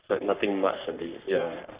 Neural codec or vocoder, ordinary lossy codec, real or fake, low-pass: codec, 44.1 kHz, 3.4 kbps, Pupu-Codec; Opus, 64 kbps; fake; 3.6 kHz